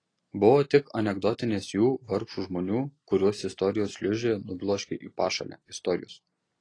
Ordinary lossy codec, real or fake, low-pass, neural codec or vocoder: AAC, 32 kbps; real; 9.9 kHz; none